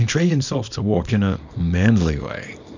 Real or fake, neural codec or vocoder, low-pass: fake; codec, 24 kHz, 0.9 kbps, WavTokenizer, small release; 7.2 kHz